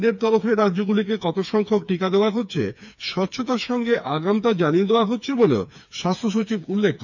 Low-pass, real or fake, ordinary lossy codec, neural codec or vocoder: 7.2 kHz; fake; none; codec, 16 kHz, 4 kbps, FreqCodec, smaller model